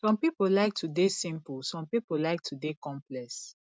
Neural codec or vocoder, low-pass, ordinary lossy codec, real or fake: none; none; none; real